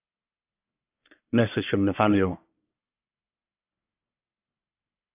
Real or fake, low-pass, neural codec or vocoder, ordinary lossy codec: fake; 3.6 kHz; codec, 44.1 kHz, 1.7 kbps, Pupu-Codec; AAC, 32 kbps